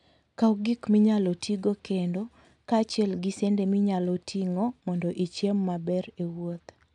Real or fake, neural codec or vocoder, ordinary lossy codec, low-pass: real; none; none; 10.8 kHz